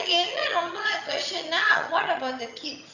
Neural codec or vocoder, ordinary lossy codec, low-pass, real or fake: vocoder, 22.05 kHz, 80 mel bands, HiFi-GAN; none; 7.2 kHz; fake